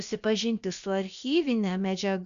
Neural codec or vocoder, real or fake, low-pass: codec, 16 kHz, about 1 kbps, DyCAST, with the encoder's durations; fake; 7.2 kHz